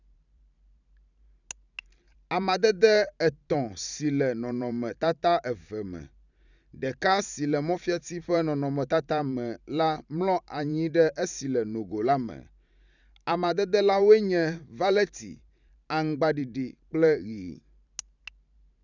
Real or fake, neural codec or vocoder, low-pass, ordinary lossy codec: fake; vocoder, 44.1 kHz, 128 mel bands every 512 samples, BigVGAN v2; 7.2 kHz; none